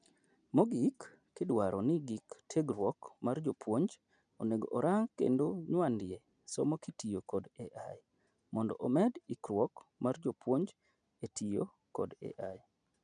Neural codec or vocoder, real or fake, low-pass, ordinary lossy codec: none; real; 9.9 kHz; none